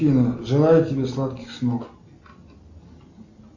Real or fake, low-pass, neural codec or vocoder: real; 7.2 kHz; none